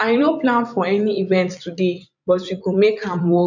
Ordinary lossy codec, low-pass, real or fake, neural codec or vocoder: none; 7.2 kHz; fake; vocoder, 44.1 kHz, 128 mel bands every 256 samples, BigVGAN v2